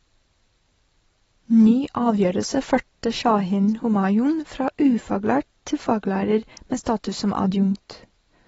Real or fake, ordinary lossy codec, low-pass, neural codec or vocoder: real; AAC, 24 kbps; 19.8 kHz; none